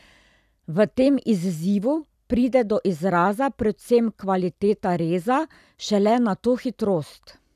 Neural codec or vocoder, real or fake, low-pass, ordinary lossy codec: vocoder, 44.1 kHz, 128 mel bands every 256 samples, BigVGAN v2; fake; 14.4 kHz; none